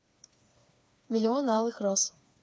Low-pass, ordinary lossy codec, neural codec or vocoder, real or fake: none; none; codec, 16 kHz, 4 kbps, FreqCodec, smaller model; fake